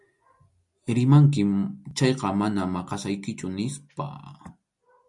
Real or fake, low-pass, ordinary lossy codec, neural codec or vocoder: real; 10.8 kHz; MP3, 96 kbps; none